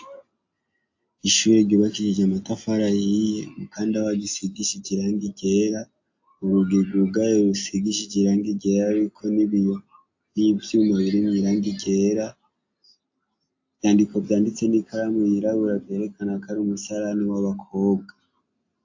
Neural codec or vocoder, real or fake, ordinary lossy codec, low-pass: none; real; AAC, 48 kbps; 7.2 kHz